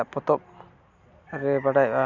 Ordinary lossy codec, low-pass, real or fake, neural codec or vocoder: none; 7.2 kHz; real; none